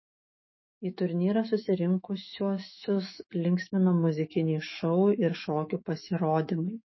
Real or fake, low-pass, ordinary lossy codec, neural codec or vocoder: fake; 7.2 kHz; MP3, 24 kbps; autoencoder, 48 kHz, 128 numbers a frame, DAC-VAE, trained on Japanese speech